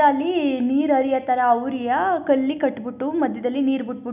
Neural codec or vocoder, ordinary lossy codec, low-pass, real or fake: none; none; 3.6 kHz; real